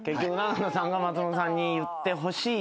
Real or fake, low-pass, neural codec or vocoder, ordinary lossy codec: real; none; none; none